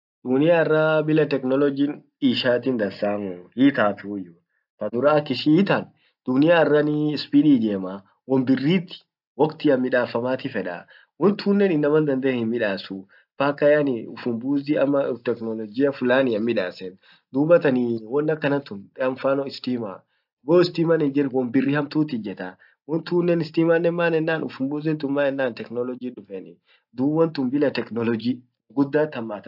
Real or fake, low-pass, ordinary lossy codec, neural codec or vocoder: real; 5.4 kHz; AAC, 48 kbps; none